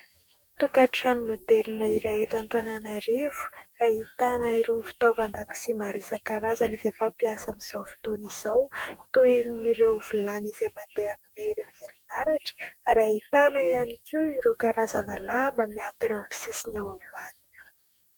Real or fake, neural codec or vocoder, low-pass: fake; codec, 44.1 kHz, 2.6 kbps, DAC; 19.8 kHz